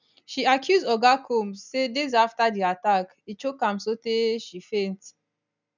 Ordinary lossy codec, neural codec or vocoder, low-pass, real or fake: none; none; 7.2 kHz; real